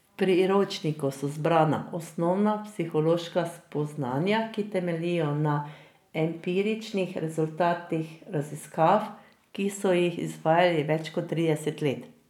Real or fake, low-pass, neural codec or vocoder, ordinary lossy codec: real; 19.8 kHz; none; none